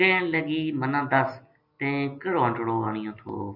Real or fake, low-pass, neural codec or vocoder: real; 5.4 kHz; none